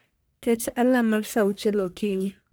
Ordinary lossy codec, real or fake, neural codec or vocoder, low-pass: none; fake; codec, 44.1 kHz, 1.7 kbps, Pupu-Codec; none